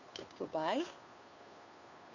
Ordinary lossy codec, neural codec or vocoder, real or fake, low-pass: none; codec, 16 kHz, 2 kbps, FunCodec, trained on Chinese and English, 25 frames a second; fake; 7.2 kHz